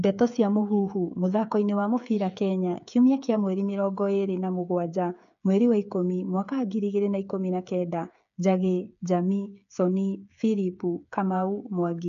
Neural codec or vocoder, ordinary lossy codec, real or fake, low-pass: codec, 16 kHz, 8 kbps, FreqCodec, smaller model; none; fake; 7.2 kHz